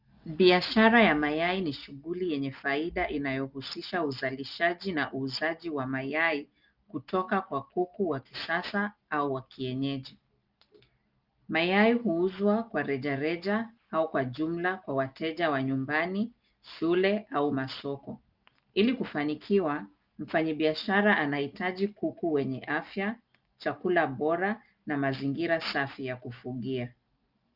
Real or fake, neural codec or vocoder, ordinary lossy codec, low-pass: real; none; Opus, 32 kbps; 5.4 kHz